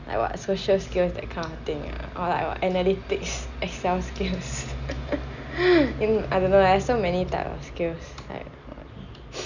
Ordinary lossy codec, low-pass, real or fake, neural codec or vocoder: none; 7.2 kHz; real; none